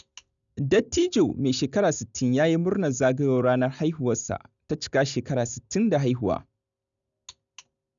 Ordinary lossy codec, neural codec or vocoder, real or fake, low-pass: none; none; real; 7.2 kHz